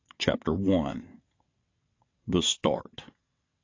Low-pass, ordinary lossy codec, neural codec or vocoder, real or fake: 7.2 kHz; AAC, 32 kbps; vocoder, 22.05 kHz, 80 mel bands, WaveNeXt; fake